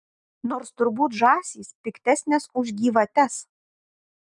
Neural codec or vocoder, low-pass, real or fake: none; 10.8 kHz; real